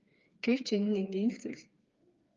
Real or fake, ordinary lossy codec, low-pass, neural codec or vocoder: fake; Opus, 32 kbps; 7.2 kHz; codec, 16 kHz, 4 kbps, FreqCodec, smaller model